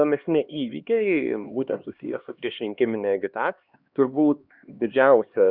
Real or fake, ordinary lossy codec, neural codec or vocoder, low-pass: fake; Opus, 64 kbps; codec, 16 kHz, 2 kbps, X-Codec, HuBERT features, trained on LibriSpeech; 5.4 kHz